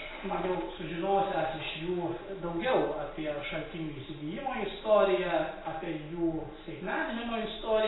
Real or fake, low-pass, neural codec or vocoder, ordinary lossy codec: real; 7.2 kHz; none; AAC, 16 kbps